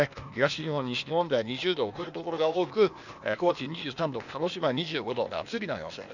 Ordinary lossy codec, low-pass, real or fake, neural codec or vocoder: none; 7.2 kHz; fake; codec, 16 kHz, 0.8 kbps, ZipCodec